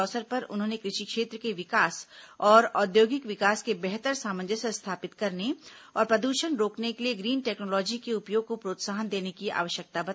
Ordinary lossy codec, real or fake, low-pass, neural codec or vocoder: none; real; none; none